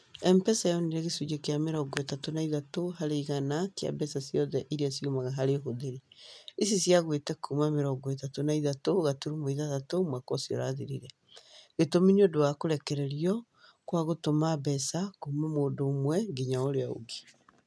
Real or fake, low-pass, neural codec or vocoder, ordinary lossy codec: real; none; none; none